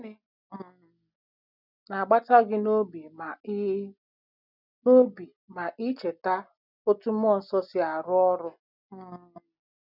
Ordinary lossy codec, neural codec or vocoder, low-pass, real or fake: none; none; 5.4 kHz; real